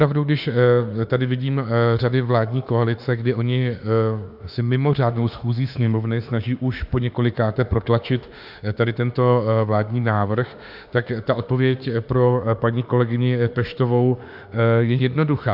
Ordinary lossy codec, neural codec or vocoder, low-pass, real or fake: AAC, 48 kbps; autoencoder, 48 kHz, 32 numbers a frame, DAC-VAE, trained on Japanese speech; 5.4 kHz; fake